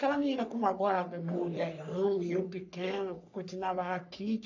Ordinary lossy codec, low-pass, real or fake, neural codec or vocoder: none; 7.2 kHz; fake; codec, 44.1 kHz, 3.4 kbps, Pupu-Codec